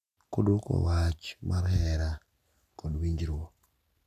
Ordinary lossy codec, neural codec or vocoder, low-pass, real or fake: none; none; 14.4 kHz; real